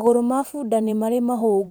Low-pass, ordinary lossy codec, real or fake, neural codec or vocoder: none; none; fake; vocoder, 44.1 kHz, 128 mel bands every 512 samples, BigVGAN v2